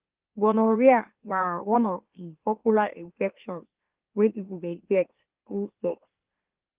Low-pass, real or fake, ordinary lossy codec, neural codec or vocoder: 3.6 kHz; fake; Opus, 32 kbps; autoencoder, 44.1 kHz, a latent of 192 numbers a frame, MeloTTS